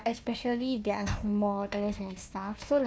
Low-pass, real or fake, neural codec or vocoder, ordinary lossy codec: none; fake; codec, 16 kHz, 1 kbps, FunCodec, trained on Chinese and English, 50 frames a second; none